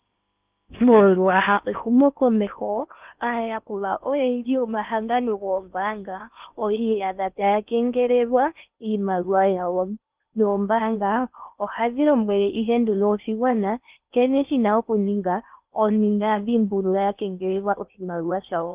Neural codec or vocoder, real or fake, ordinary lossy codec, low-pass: codec, 16 kHz in and 24 kHz out, 0.8 kbps, FocalCodec, streaming, 65536 codes; fake; Opus, 32 kbps; 3.6 kHz